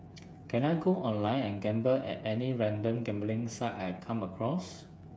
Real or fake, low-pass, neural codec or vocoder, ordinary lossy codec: fake; none; codec, 16 kHz, 8 kbps, FreqCodec, smaller model; none